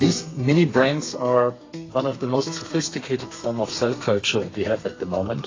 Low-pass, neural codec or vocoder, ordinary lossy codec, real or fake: 7.2 kHz; codec, 32 kHz, 1.9 kbps, SNAC; AAC, 32 kbps; fake